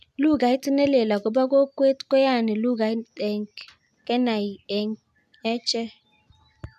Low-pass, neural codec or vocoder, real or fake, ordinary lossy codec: 14.4 kHz; none; real; none